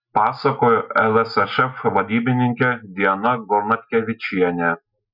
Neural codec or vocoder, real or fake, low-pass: none; real; 5.4 kHz